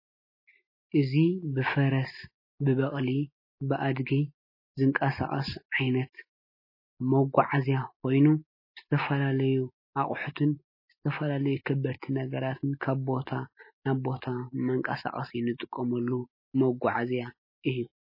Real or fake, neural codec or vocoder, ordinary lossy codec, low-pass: real; none; MP3, 24 kbps; 5.4 kHz